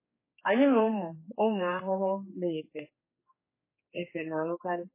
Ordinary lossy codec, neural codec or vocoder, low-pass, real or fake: MP3, 16 kbps; codec, 16 kHz, 2 kbps, X-Codec, HuBERT features, trained on general audio; 3.6 kHz; fake